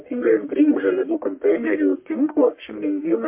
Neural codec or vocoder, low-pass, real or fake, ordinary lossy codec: codec, 44.1 kHz, 1.7 kbps, Pupu-Codec; 3.6 kHz; fake; MP3, 24 kbps